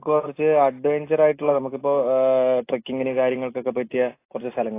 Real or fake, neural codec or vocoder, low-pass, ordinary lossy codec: real; none; 3.6 kHz; AAC, 24 kbps